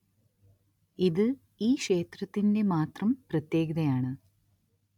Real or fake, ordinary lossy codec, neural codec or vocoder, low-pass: real; none; none; 19.8 kHz